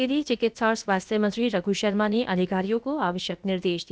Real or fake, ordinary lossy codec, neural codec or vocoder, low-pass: fake; none; codec, 16 kHz, about 1 kbps, DyCAST, with the encoder's durations; none